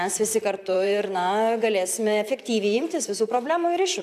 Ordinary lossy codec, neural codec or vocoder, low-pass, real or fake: AAC, 96 kbps; vocoder, 44.1 kHz, 128 mel bands, Pupu-Vocoder; 14.4 kHz; fake